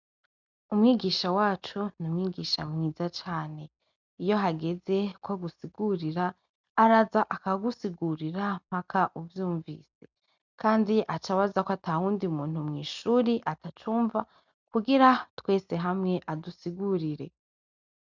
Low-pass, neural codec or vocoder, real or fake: 7.2 kHz; none; real